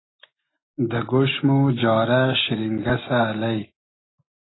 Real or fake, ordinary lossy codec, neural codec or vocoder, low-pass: real; AAC, 16 kbps; none; 7.2 kHz